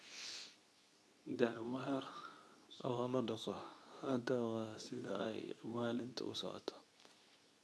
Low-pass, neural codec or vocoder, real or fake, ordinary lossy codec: none; codec, 24 kHz, 0.9 kbps, WavTokenizer, medium speech release version 2; fake; none